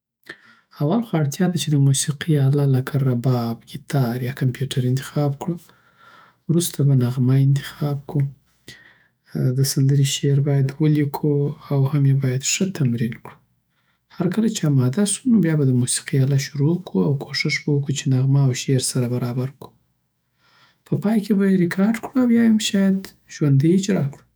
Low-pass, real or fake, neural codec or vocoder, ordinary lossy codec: none; fake; autoencoder, 48 kHz, 128 numbers a frame, DAC-VAE, trained on Japanese speech; none